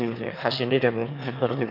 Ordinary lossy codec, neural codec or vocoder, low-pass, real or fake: none; autoencoder, 22.05 kHz, a latent of 192 numbers a frame, VITS, trained on one speaker; 5.4 kHz; fake